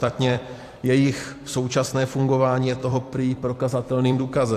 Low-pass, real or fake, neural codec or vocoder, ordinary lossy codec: 14.4 kHz; fake; vocoder, 44.1 kHz, 128 mel bands every 256 samples, BigVGAN v2; AAC, 64 kbps